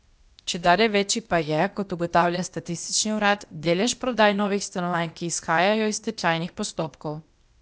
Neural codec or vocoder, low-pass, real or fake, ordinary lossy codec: codec, 16 kHz, 0.8 kbps, ZipCodec; none; fake; none